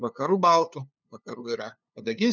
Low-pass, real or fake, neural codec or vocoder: 7.2 kHz; fake; codec, 16 kHz, 2 kbps, FunCodec, trained on LibriTTS, 25 frames a second